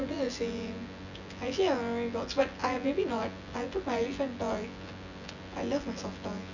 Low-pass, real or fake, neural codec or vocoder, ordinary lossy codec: 7.2 kHz; fake; vocoder, 24 kHz, 100 mel bands, Vocos; none